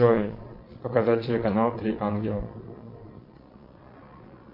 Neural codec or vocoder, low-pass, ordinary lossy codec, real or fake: vocoder, 22.05 kHz, 80 mel bands, Vocos; 5.4 kHz; MP3, 32 kbps; fake